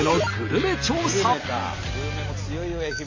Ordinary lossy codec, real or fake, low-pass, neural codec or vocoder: AAC, 32 kbps; real; 7.2 kHz; none